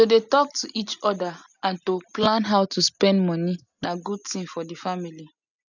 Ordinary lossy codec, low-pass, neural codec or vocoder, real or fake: none; 7.2 kHz; none; real